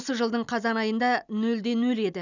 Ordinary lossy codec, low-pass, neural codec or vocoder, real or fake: none; 7.2 kHz; none; real